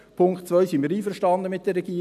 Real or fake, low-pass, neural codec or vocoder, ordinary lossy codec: real; 14.4 kHz; none; none